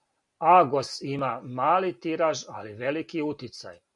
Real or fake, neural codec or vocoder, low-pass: real; none; 10.8 kHz